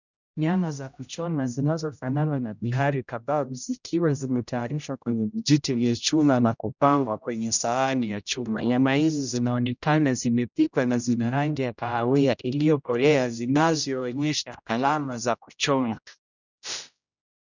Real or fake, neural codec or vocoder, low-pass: fake; codec, 16 kHz, 0.5 kbps, X-Codec, HuBERT features, trained on general audio; 7.2 kHz